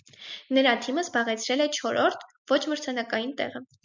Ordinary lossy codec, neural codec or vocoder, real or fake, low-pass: MP3, 64 kbps; none; real; 7.2 kHz